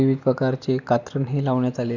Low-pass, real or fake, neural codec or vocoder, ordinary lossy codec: 7.2 kHz; real; none; none